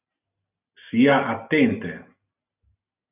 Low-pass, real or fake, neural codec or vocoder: 3.6 kHz; real; none